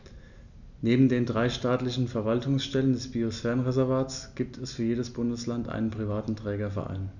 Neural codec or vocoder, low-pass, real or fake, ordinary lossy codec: none; 7.2 kHz; real; none